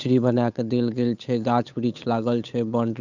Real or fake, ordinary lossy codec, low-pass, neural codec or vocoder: fake; none; 7.2 kHz; codec, 16 kHz, 8 kbps, FunCodec, trained on Chinese and English, 25 frames a second